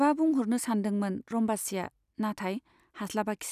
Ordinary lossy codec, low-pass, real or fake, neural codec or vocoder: none; 14.4 kHz; real; none